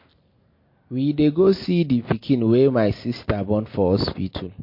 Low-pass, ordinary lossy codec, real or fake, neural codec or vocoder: 5.4 kHz; MP3, 32 kbps; real; none